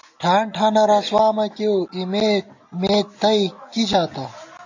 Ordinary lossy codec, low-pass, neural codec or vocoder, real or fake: AAC, 48 kbps; 7.2 kHz; none; real